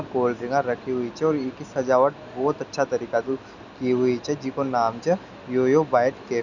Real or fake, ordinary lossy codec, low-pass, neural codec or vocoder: real; none; 7.2 kHz; none